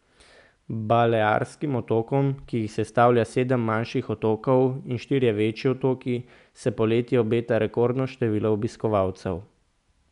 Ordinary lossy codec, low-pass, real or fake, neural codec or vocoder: none; 10.8 kHz; real; none